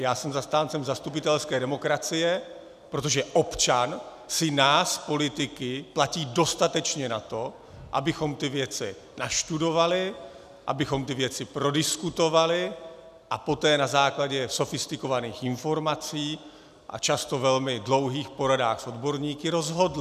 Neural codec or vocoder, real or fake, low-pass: none; real; 14.4 kHz